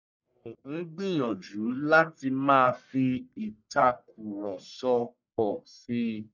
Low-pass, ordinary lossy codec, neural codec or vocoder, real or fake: 7.2 kHz; none; codec, 44.1 kHz, 1.7 kbps, Pupu-Codec; fake